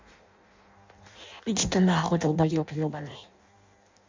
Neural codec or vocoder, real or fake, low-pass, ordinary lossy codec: codec, 16 kHz in and 24 kHz out, 0.6 kbps, FireRedTTS-2 codec; fake; 7.2 kHz; MP3, 64 kbps